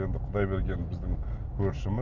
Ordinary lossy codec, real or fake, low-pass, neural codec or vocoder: none; real; 7.2 kHz; none